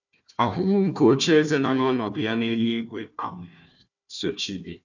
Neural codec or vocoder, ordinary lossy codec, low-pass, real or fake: codec, 16 kHz, 1 kbps, FunCodec, trained on Chinese and English, 50 frames a second; none; 7.2 kHz; fake